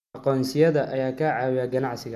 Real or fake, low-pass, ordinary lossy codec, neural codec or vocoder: real; 14.4 kHz; MP3, 96 kbps; none